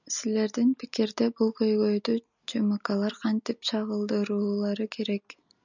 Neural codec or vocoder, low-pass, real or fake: none; 7.2 kHz; real